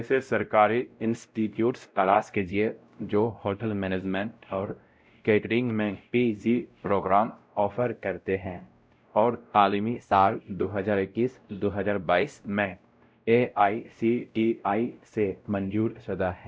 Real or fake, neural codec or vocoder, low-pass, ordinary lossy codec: fake; codec, 16 kHz, 0.5 kbps, X-Codec, WavLM features, trained on Multilingual LibriSpeech; none; none